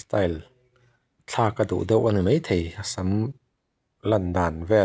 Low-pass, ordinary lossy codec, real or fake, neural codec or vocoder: none; none; real; none